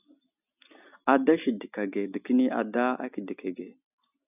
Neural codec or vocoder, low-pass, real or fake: none; 3.6 kHz; real